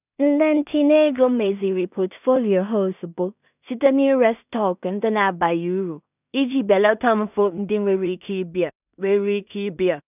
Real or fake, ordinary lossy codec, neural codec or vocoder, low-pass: fake; none; codec, 16 kHz in and 24 kHz out, 0.4 kbps, LongCat-Audio-Codec, two codebook decoder; 3.6 kHz